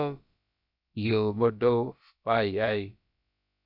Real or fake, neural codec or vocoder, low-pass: fake; codec, 16 kHz, about 1 kbps, DyCAST, with the encoder's durations; 5.4 kHz